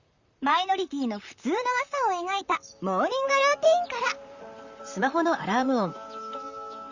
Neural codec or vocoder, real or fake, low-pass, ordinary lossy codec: vocoder, 44.1 kHz, 128 mel bands, Pupu-Vocoder; fake; 7.2 kHz; Opus, 64 kbps